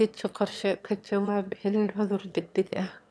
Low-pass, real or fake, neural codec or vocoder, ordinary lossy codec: none; fake; autoencoder, 22.05 kHz, a latent of 192 numbers a frame, VITS, trained on one speaker; none